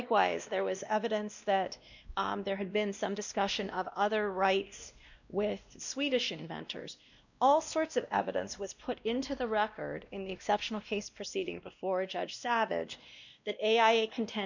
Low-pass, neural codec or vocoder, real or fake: 7.2 kHz; codec, 16 kHz, 1 kbps, X-Codec, WavLM features, trained on Multilingual LibriSpeech; fake